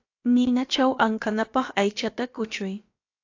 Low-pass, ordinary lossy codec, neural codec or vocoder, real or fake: 7.2 kHz; AAC, 48 kbps; codec, 16 kHz, about 1 kbps, DyCAST, with the encoder's durations; fake